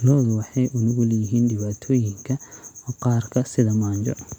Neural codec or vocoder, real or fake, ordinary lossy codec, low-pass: none; real; none; 19.8 kHz